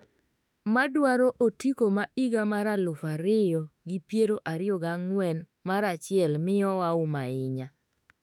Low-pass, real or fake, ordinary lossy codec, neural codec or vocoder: 19.8 kHz; fake; none; autoencoder, 48 kHz, 32 numbers a frame, DAC-VAE, trained on Japanese speech